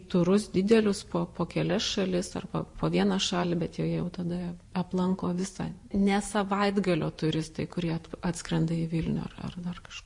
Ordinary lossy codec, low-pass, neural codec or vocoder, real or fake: MP3, 48 kbps; 10.8 kHz; vocoder, 44.1 kHz, 128 mel bands every 512 samples, BigVGAN v2; fake